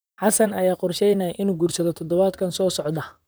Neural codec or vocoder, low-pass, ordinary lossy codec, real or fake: vocoder, 44.1 kHz, 128 mel bands, Pupu-Vocoder; none; none; fake